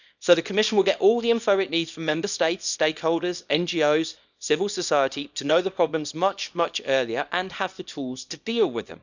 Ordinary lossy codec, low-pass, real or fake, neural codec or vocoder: none; 7.2 kHz; fake; codec, 24 kHz, 0.9 kbps, WavTokenizer, small release